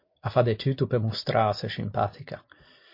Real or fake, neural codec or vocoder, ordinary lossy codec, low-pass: real; none; MP3, 32 kbps; 5.4 kHz